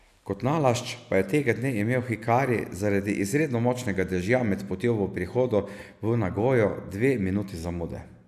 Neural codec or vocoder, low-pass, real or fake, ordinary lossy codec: none; 14.4 kHz; real; none